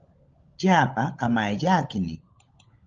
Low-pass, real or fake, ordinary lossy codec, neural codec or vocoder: 7.2 kHz; fake; Opus, 24 kbps; codec, 16 kHz, 16 kbps, FunCodec, trained on LibriTTS, 50 frames a second